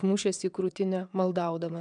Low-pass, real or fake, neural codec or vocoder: 9.9 kHz; fake; vocoder, 22.05 kHz, 80 mel bands, WaveNeXt